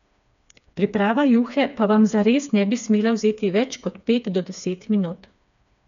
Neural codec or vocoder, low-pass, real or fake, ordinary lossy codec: codec, 16 kHz, 4 kbps, FreqCodec, smaller model; 7.2 kHz; fake; none